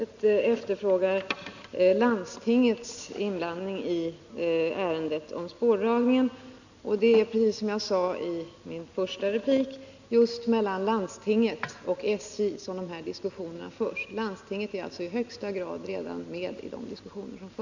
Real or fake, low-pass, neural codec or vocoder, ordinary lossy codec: real; 7.2 kHz; none; none